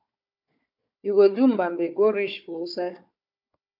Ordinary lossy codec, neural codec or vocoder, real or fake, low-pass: AAC, 48 kbps; codec, 16 kHz, 4 kbps, FunCodec, trained on Chinese and English, 50 frames a second; fake; 5.4 kHz